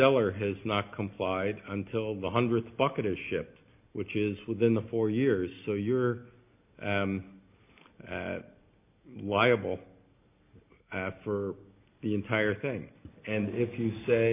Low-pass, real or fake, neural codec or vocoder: 3.6 kHz; real; none